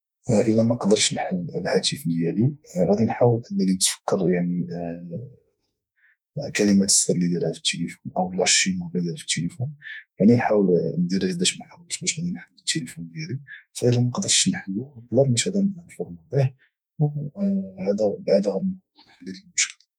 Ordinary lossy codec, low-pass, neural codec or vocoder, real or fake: none; 19.8 kHz; autoencoder, 48 kHz, 32 numbers a frame, DAC-VAE, trained on Japanese speech; fake